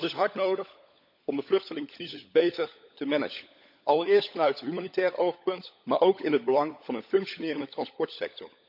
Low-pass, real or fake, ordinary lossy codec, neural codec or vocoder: 5.4 kHz; fake; none; codec, 16 kHz, 16 kbps, FunCodec, trained on LibriTTS, 50 frames a second